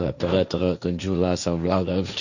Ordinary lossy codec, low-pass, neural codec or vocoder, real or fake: none; 7.2 kHz; codec, 16 kHz, 1.1 kbps, Voila-Tokenizer; fake